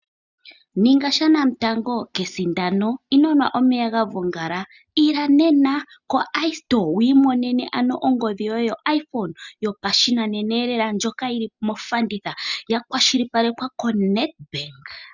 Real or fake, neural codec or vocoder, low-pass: real; none; 7.2 kHz